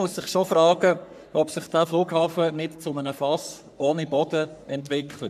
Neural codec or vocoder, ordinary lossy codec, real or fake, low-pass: codec, 44.1 kHz, 3.4 kbps, Pupu-Codec; none; fake; 14.4 kHz